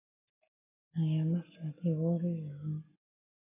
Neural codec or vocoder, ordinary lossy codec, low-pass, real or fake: codec, 44.1 kHz, 7.8 kbps, Pupu-Codec; AAC, 32 kbps; 3.6 kHz; fake